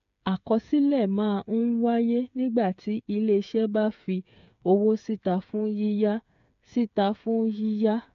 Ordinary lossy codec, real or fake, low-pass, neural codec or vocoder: AAC, 64 kbps; fake; 7.2 kHz; codec, 16 kHz, 8 kbps, FreqCodec, smaller model